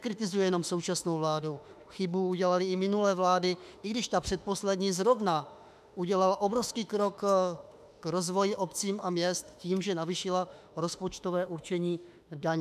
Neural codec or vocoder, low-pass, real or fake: autoencoder, 48 kHz, 32 numbers a frame, DAC-VAE, trained on Japanese speech; 14.4 kHz; fake